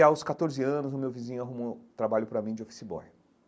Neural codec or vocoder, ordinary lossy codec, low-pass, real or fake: none; none; none; real